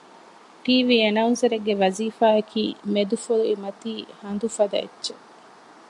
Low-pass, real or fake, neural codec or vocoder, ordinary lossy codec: 10.8 kHz; real; none; AAC, 64 kbps